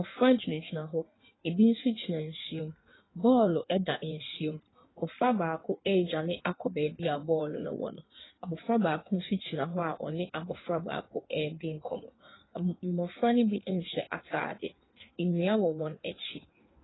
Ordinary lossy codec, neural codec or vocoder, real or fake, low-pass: AAC, 16 kbps; codec, 16 kHz in and 24 kHz out, 1.1 kbps, FireRedTTS-2 codec; fake; 7.2 kHz